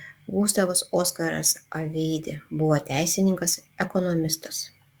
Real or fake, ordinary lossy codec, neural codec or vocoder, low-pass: fake; Opus, 64 kbps; codec, 44.1 kHz, 7.8 kbps, DAC; 19.8 kHz